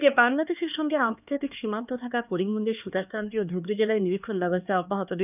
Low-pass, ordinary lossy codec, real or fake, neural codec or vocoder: 3.6 kHz; none; fake; codec, 16 kHz, 2 kbps, X-Codec, HuBERT features, trained on LibriSpeech